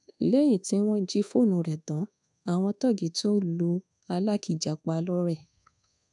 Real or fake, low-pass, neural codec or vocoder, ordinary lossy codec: fake; 10.8 kHz; codec, 24 kHz, 1.2 kbps, DualCodec; none